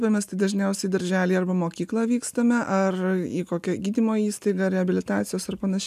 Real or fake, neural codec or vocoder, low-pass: real; none; 14.4 kHz